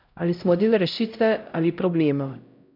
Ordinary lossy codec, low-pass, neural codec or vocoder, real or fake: none; 5.4 kHz; codec, 16 kHz, 0.5 kbps, X-Codec, HuBERT features, trained on LibriSpeech; fake